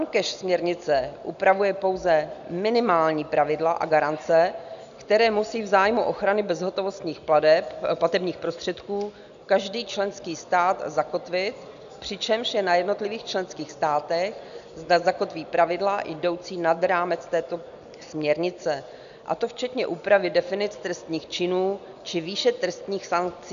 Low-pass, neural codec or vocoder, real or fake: 7.2 kHz; none; real